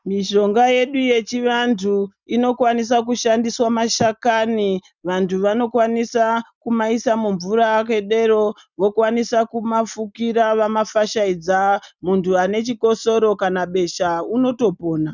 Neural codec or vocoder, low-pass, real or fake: none; 7.2 kHz; real